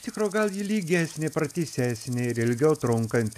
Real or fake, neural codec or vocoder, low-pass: real; none; 14.4 kHz